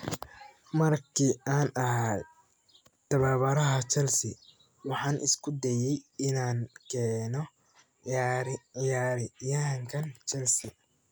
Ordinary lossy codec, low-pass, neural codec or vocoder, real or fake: none; none; none; real